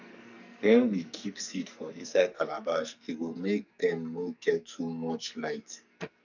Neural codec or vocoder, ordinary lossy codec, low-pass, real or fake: codec, 44.1 kHz, 2.6 kbps, SNAC; none; 7.2 kHz; fake